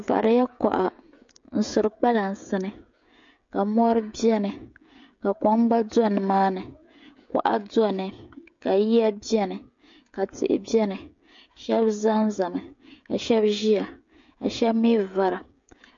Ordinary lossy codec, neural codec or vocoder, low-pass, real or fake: MP3, 48 kbps; codec, 16 kHz, 16 kbps, FreqCodec, smaller model; 7.2 kHz; fake